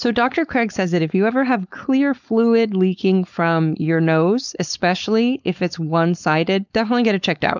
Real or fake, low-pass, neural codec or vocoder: fake; 7.2 kHz; codec, 16 kHz, 4.8 kbps, FACodec